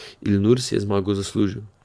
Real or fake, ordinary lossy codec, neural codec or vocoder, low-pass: fake; none; vocoder, 22.05 kHz, 80 mel bands, Vocos; none